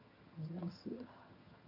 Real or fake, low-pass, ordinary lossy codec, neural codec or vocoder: fake; 5.4 kHz; none; vocoder, 22.05 kHz, 80 mel bands, HiFi-GAN